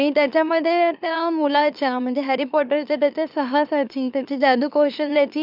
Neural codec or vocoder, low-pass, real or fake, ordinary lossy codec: autoencoder, 44.1 kHz, a latent of 192 numbers a frame, MeloTTS; 5.4 kHz; fake; none